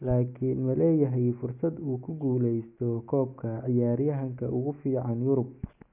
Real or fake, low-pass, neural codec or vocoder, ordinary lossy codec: real; 3.6 kHz; none; none